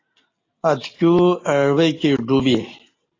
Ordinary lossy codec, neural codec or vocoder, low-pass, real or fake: AAC, 32 kbps; none; 7.2 kHz; real